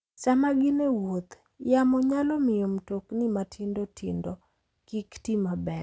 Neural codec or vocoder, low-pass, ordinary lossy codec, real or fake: none; none; none; real